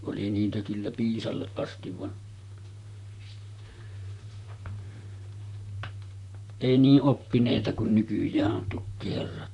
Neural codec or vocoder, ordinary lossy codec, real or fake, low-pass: vocoder, 44.1 kHz, 128 mel bands, Pupu-Vocoder; none; fake; 10.8 kHz